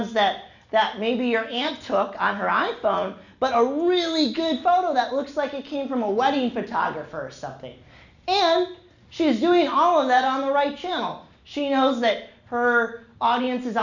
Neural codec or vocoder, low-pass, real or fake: none; 7.2 kHz; real